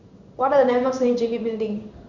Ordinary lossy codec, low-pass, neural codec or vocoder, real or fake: Opus, 64 kbps; 7.2 kHz; codec, 16 kHz in and 24 kHz out, 1 kbps, XY-Tokenizer; fake